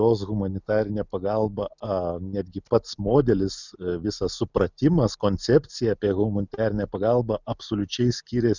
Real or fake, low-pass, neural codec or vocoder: real; 7.2 kHz; none